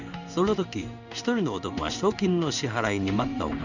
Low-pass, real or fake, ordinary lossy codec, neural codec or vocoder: 7.2 kHz; fake; none; codec, 16 kHz in and 24 kHz out, 1 kbps, XY-Tokenizer